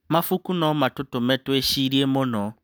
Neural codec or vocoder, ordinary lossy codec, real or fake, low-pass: none; none; real; none